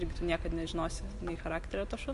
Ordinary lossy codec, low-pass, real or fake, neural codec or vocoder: MP3, 48 kbps; 14.4 kHz; real; none